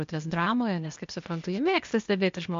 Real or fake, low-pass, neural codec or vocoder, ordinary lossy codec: fake; 7.2 kHz; codec, 16 kHz, 0.8 kbps, ZipCodec; MP3, 48 kbps